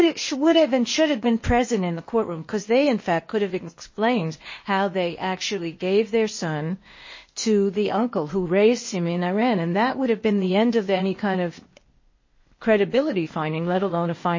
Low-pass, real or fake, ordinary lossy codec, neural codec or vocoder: 7.2 kHz; fake; MP3, 32 kbps; codec, 16 kHz, 0.8 kbps, ZipCodec